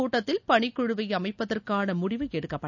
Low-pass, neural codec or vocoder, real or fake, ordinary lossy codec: 7.2 kHz; none; real; none